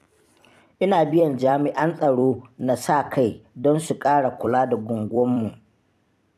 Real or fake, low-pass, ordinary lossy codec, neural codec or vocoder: fake; 14.4 kHz; none; vocoder, 44.1 kHz, 128 mel bands every 256 samples, BigVGAN v2